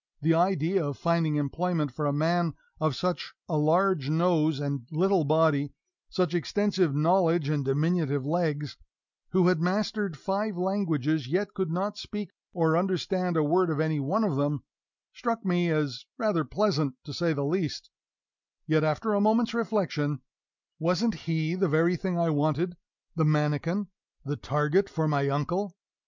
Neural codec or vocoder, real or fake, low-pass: none; real; 7.2 kHz